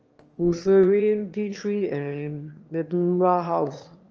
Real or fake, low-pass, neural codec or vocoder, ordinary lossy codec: fake; 7.2 kHz; autoencoder, 22.05 kHz, a latent of 192 numbers a frame, VITS, trained on one speaker; Opus, 24 kbps